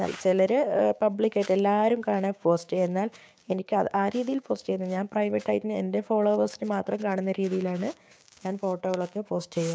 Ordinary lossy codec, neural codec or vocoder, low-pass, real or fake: none; codec, 16 kHz, 6 kbps, DAC; none; fake